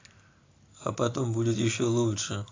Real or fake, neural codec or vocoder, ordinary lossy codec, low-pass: real; none; AAC, 32 kbps; 7.2 kHz